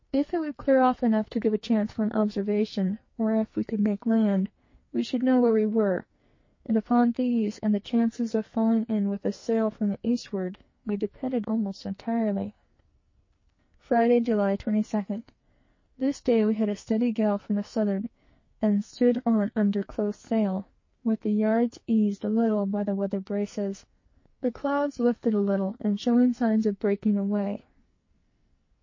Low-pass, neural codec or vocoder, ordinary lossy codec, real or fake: 7.2 kHz; codec, 44.1 kHz, 2.6 kbps, SNAC; MP3, 32 kbps; fake